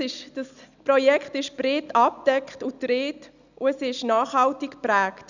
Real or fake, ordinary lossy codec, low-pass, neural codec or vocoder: real; none; 7.2 kHz; none